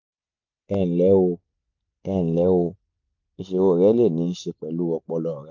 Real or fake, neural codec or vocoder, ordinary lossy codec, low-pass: real; none; MP3, 48 kbps; 7.2 kHz